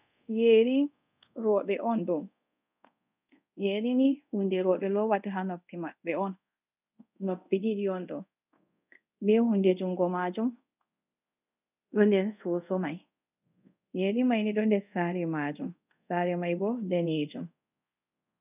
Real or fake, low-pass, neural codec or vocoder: fake; 3.6 kHz; codec, 24 kHz, 0.5 kbps, DualCodec